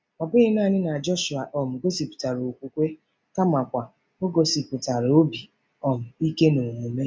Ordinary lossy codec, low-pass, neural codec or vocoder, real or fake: none; none; none; real